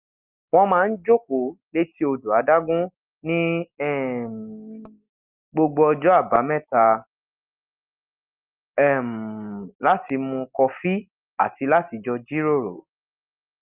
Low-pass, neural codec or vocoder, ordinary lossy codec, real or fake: 3.6 kHz; none; Opus, 24 kbps; real